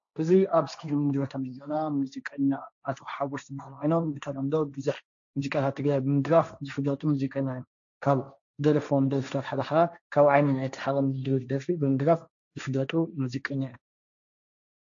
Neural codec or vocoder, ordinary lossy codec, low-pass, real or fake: codec, 16 kHz, 1.1 kbps, Voila-Tokenizer; AAC, 64 kbps; 7.2 kHz; fake